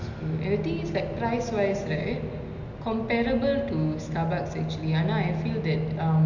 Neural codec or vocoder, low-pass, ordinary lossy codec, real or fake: none; 7.2 kHz; none; real